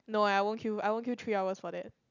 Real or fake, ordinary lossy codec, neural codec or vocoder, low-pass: real; none; none; 7.2 kHz